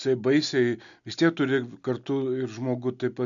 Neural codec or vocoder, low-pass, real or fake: none; 7.2 kHz; real